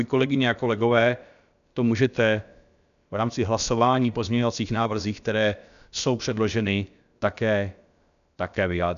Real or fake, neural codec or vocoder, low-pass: fake; codec, 16 kHz, about 1 kbps, DyCAST, with the encoder's durations; 7.2 kHz